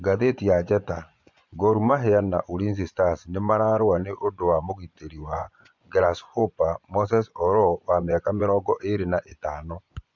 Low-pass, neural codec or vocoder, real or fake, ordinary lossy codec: 7.2 kHz; vocoder, 24 kHz, 100 mel bands, Vocos; fake; MP3, 64 kbps